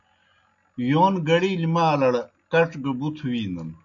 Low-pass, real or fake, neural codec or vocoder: 7.2 kHz; real; none